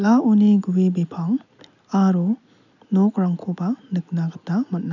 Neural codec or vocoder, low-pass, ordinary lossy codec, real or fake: none; 7.2 kHz; none; real